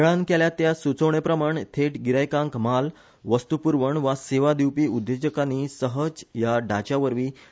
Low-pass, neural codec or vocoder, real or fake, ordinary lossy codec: none; none; real; none